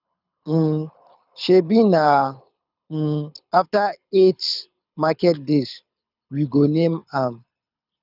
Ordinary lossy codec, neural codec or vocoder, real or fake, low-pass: none; codec, 24 kHz, 6 kbps, HILCodec; fake; 5.4 kHz